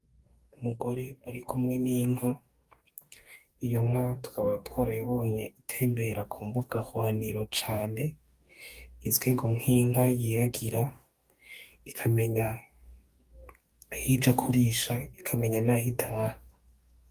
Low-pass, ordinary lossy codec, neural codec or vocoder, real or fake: 14.4 kHz; Opus, 32 kbps; codec, 44.1 kHz, 2.6 kbps, DAC; fake